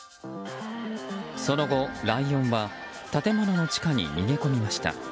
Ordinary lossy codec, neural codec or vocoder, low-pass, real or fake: none; none; none; real